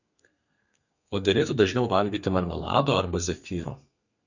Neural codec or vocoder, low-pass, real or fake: codec, 32 kHz, 1.9 kbps, SNAC; 7.2 kHz; fake